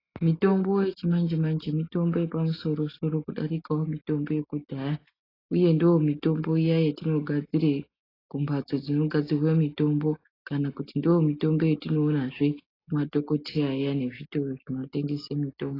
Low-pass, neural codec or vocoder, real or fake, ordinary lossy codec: 5.4 kHz; none; real; AAC, 24 kbps